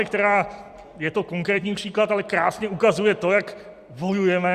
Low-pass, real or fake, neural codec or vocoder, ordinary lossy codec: 14.4 kHz; real; none; Opus, 64 kbps